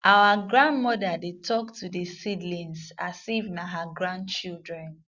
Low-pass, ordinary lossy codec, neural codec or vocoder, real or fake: 7.2 kHz; Opus, 64 kbps; none; real